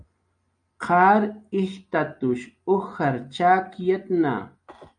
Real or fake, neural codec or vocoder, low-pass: real; none; 9.9 kHz